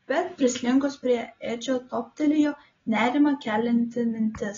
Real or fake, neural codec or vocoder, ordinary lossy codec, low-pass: real; none; AAC, 24 kbps; 7.2 kHz